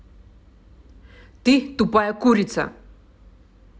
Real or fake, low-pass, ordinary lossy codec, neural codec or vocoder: real; none; none; none